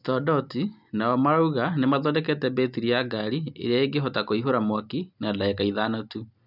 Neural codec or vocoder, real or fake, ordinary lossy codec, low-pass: none; real; none; 5.4 kHz